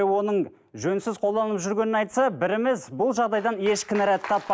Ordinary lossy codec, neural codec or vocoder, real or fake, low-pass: none; none; real; none